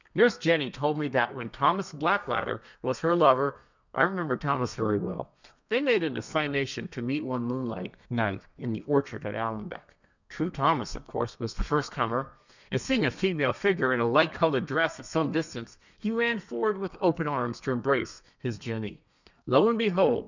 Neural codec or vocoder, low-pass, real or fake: codec, 32 kHz, 1.9 kbps, SNAC; 7.2 kHz; fake